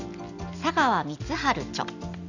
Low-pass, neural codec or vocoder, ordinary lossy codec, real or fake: 7.2 kHz; none; none; real